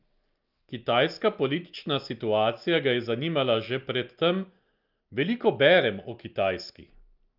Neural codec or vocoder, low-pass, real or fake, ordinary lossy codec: none; 5.4 kHz; real; Opus, 24 kbps